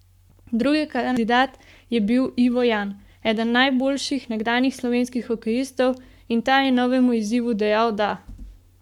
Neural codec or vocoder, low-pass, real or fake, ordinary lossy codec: codec, 44.1 kHz, 7.8 kbps, Pupu-Codec; 19.8 kHz; fake; none